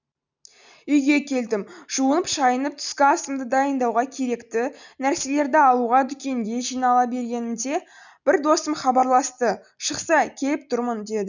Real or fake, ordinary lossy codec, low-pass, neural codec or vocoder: real; none; 7.2 kHz; none